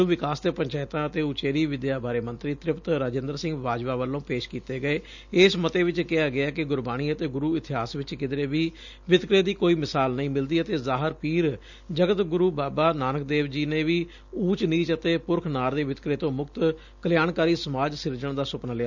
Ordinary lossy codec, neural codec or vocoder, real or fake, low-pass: none; none; real; 7.2 kHz